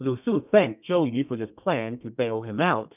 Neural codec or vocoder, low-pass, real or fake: codec, 32 kHz, 1.9 kbps, SNAC; 3.6 kHz; fake